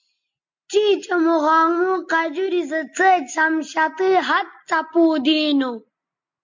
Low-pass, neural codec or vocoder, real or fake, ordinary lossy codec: 7.2 kHz; none; real; MP3, 48 kbps